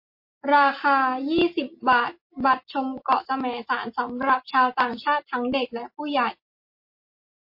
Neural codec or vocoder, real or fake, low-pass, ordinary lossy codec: none; real; 5.4 kHz; MP3, 32 kbps